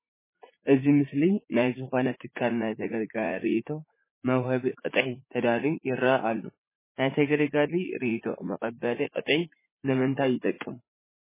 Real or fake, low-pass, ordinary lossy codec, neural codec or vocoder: fake; 3.6 kHz; MP3, 16 kbps; vocoder, 44.1 kHz, 80 mel bands, Vocos